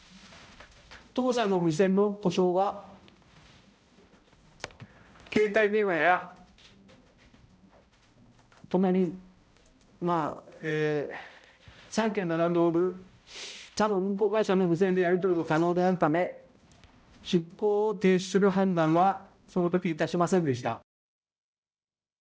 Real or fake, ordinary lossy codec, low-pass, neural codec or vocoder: fake; none; none; codec, 16 kHz, 0.5 kbps, X-Codec, HuBERT features, trained on balanced general audio